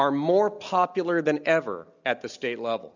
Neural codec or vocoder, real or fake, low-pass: none; real; 7.2 kHz